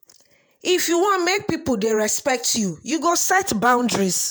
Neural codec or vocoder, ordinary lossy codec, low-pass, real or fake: vocoder, 48 kHz, 128 mel bands, Vocos; none; none; fake